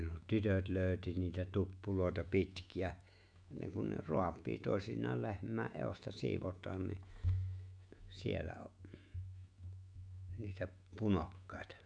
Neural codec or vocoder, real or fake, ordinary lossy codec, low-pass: autoencoder, 48 kHz, 128 numbers a frame, DAC-VAE, trained on Japanese speech; fake; none; 9.9 kHz